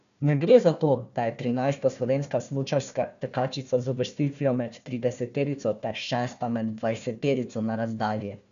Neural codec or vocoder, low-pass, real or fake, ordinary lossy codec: codec, 16 kHz, 1 kbps, FunCodec, trained on Chinese and English, 50 frames a second; 7.2 kHz; fake; none